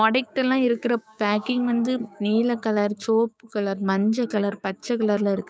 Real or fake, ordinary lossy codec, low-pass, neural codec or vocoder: fake; none; none; codec, 16 kHz, 6 kbps, DAC